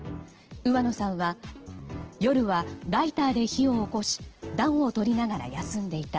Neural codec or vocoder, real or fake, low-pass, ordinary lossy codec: none; real; 7.2 kHz; Opus, 16 kbps